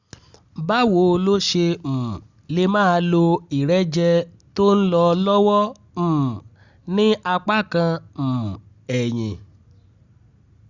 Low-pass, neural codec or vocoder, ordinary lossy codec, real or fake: 7.2 kHz; none; Opus, 64 kbps; real